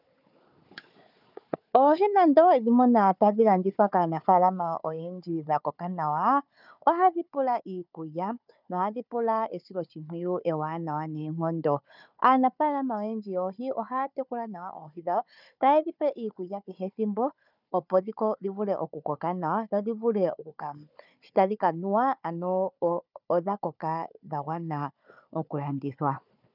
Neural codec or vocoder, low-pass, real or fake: codec, 16 kHz, 4 kbps, FunCodec, trained on Chinese and English, 50 frames a second; 5.4 kHz; fake